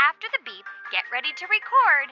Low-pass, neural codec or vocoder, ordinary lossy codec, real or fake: 7.2 kHz; none; Opus, 64 kbps; real